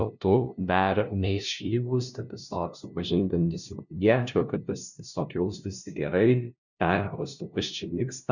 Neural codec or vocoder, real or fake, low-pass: codec, 16 kHz, 0.5 kbps, FunCodec, trained on LibriTTS, 25 frames a second; fake; 7.2 kHz